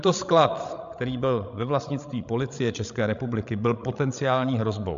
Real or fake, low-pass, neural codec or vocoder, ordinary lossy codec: fake; 7.2 kHz; codec, 16 kHz, 16 kbps, FreqCodec, larger model; MP3, 64 kbps